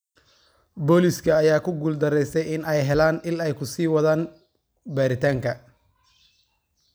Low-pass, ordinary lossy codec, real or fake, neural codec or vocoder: none; none; real; none